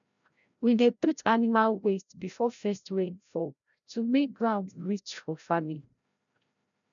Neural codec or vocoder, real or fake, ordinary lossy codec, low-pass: codec, 16 kHz, 0.5 kbps, FreqCodec, larger model; fake; none; 7.2 kHz